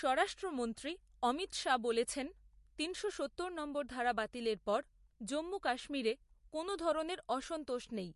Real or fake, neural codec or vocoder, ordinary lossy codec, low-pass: real; none; MP3, 48 kbps; 14.4 kHz